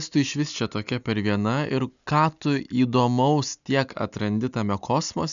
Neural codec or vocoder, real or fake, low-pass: none; real; 7.2 kHz